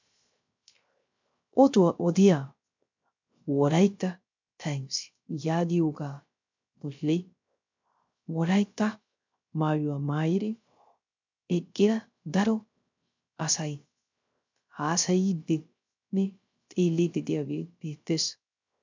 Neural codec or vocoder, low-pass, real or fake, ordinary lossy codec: codec, 16 kHz, 0.3 kbps, FocalCodec; 7.2 kHz; fake; MP3, 64 kbps